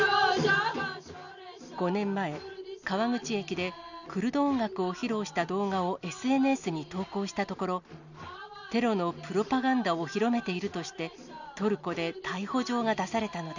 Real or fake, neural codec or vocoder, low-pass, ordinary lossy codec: real; none; 7.2 kHz; none